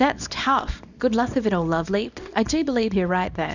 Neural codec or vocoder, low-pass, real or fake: codec, 24 kHz, 0.9 kbps, WavTokenizer, small release; 7.2 kHz; fake